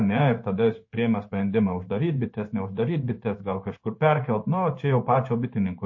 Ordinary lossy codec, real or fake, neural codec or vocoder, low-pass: MP3, 32 kbps; fake; codec, 16 kHz in and 24 kHz out, 1 kbps, XY-Tokenizer; 7.2 kHz